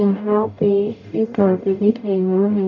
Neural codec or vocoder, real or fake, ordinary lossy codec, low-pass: codec, 44.1 kHz, 0.9 kbps, DAC; fake; none; 7.2 kHz